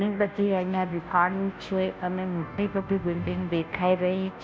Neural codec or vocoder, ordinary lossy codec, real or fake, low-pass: codec, 16 kHz, 0.5 kbps, FunCodec, trained on Chinese and English, 25 frames a second; none; fake; none